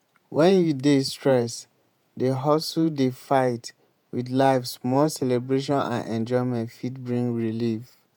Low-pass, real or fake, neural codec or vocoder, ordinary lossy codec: none; fake; vocoder, 48 kHz, 128 mel bands, Vocos; none